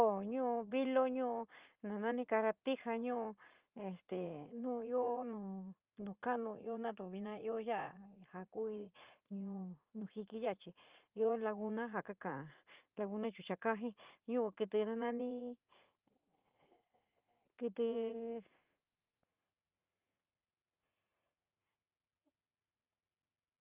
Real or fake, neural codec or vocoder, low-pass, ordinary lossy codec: fake; vocoder, 44.1 kHz, 80 mel bands, Vocos; 3.6 kHz; Opus, 24 kbps